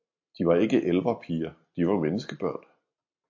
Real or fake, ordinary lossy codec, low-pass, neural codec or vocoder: real; AAC, 48 kbps; 7.2 kHz; none